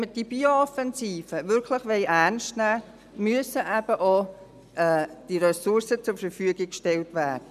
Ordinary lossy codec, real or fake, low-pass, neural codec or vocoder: none; real; 14.4 kHz; none